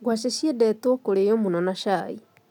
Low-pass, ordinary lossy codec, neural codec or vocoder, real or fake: 19.8 kHz; none; none; real